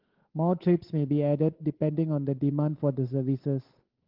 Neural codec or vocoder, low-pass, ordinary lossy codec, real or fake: codec, 16 kHz, 8 kbps, FunCodec, trained on Chinese and English, 25 frames a second; 5.4 kHz; Opus, 16 kbps; fake